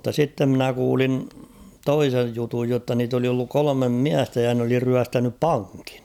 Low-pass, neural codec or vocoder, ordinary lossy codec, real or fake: 19.8 kHz; vocoder, 44.1 kHz, 128 mel bands every 256 samples, BigVGAN v2; none; fake